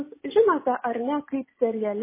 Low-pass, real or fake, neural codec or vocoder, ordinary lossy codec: 3.6 kHz; real; none; MP3, 16 kbps